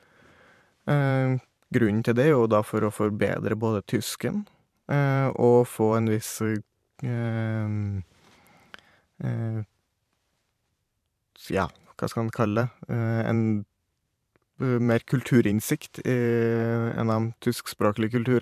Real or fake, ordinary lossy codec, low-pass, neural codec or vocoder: fake; MP3, 96 kbps; 14.4 kHz; vocoder, 44.1 kHz, 128 mel bands every 512 samples, BigVGAN v2